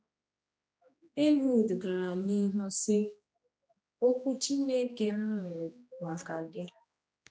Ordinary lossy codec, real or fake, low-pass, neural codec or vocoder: none; fake; none; codec, 16 kHz, 1 kbps, X-Codec, HuBERT features, trained on general audio